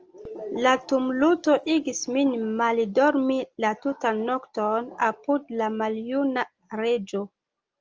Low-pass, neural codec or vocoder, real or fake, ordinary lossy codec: 7.2 kHz; none; real; Opus, 24 kbps